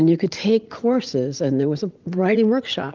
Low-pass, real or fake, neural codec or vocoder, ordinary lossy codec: 7.2 kHz; fake; vocoder, 44.1 kHz, 80 mel bands, Vocos; Opus, 32 kbps